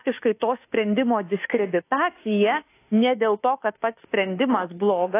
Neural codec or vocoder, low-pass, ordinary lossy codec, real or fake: autoencoder, 48 kHz, 32 numbers a frame, DAC-VAE, trained on Japanese speech; 3.6 kHz; AAC, 24 kbps; fake